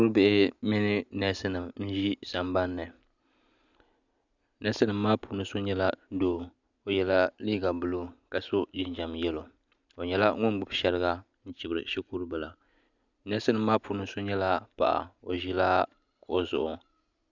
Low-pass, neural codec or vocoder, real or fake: 7.2 kHz; none; real